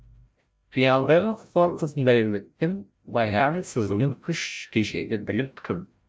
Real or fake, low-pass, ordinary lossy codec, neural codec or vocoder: fake; none; none; codec, 16 kHz, 0.5 kbps, FreqCodec, larger model